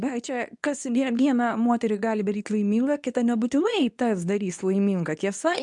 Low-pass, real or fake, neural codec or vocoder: 10.8 kHz; fake; codec, 24 kHz, 0.9 kbps, WavTokenizer, medium speech release version 2